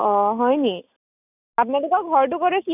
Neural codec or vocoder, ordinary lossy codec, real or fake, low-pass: none; none; real; 3.6 kHz